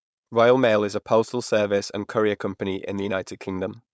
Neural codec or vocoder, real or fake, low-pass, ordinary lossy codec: codec, 16 kHz, 4.8 kbps, FACodec; fake; none; none